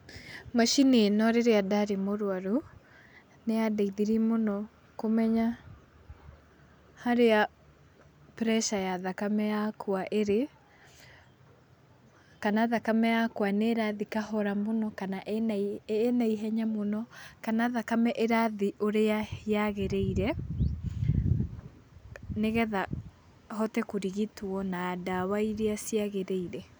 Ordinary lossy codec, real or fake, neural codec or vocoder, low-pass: none; real; none; none